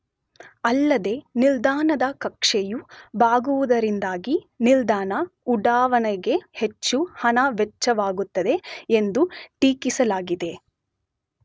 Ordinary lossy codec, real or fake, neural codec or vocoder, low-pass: none; real; none; none